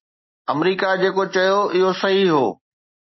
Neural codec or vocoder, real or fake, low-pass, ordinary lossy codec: none; real; 7.2 kHz; MP3, 24 kbps